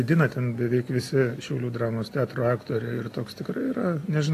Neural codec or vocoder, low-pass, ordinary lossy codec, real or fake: none; 14.4 kHz; AAC, 48 kbps; real